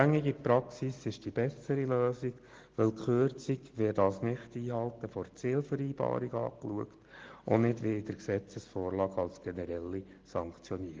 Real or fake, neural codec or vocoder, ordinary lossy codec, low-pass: real; none; Opus, 16 kbps; 7.2 kHz